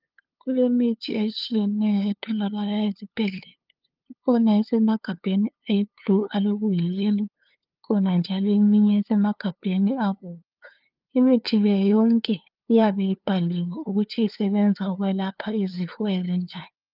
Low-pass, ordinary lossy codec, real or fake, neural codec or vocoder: 5.4 kHz; Opus, 24 kbps; fake; codec, 16 kHz, 2 kbps, FunCodec, trained on LibriTTS, 25 frames a second